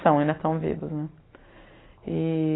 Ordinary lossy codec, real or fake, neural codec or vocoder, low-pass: AAC, 16 kbps; real; none; 7.2 kHz